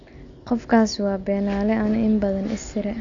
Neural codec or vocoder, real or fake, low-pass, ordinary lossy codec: none; real; 7.2 kHz; none